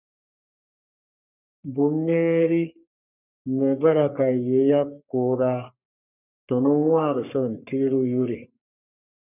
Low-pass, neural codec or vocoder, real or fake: 3.6 kHz; codec, 44.1 kHz, 3.4 kbps, Pupu-Codec; fake